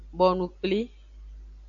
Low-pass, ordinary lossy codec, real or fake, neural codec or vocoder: 7.2 kHz; AAC, 64 kbps; real; none